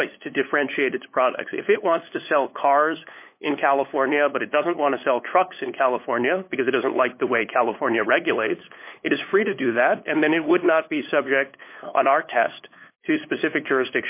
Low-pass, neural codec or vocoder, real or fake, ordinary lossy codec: 3.6 kHz; codec, 16 kHz, 8 kbps, FunCodec, trained on LibriTTS, 25 frames a second; fake; MP3, 24 kbps